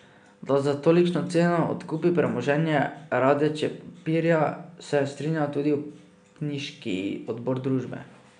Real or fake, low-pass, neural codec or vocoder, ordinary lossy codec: real; 9.9 kHz; none; none